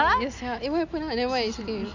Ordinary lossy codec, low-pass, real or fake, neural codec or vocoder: none; 7.2 kHz; real; none